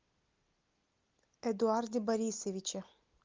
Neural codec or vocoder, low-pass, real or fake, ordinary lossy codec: none; 7.2 kHz; real; Opus, 32 kbps